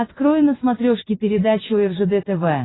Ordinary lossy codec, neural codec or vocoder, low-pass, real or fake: AAC, 16 kbps; none; 7.2 kHz; real